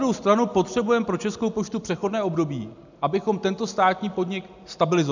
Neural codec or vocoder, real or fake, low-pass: none; real; 7.2 kHz